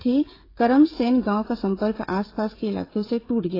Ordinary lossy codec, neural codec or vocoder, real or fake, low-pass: AAC, 24 kbps; codec, 16 kHz, 16 kbps, FreqCodec, smaller model; fake; 5.4 kHz